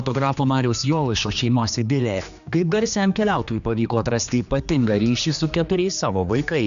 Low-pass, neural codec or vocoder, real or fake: 7.2 kHz; codec, 16 kHz, 2 kbps, X-Codec, HuBERT features, trained on general audio; fake